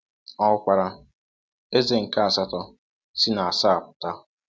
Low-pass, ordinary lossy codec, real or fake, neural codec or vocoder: none; none; real; none